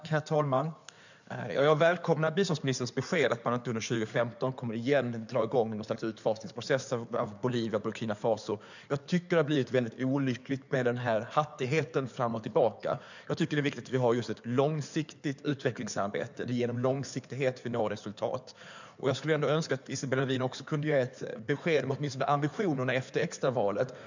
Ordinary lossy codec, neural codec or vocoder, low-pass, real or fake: none; codec, 16 kHz in and 24 kHz out, 2.2 kbps, FireRedTTS-2 codec; 7.2 kHz; fake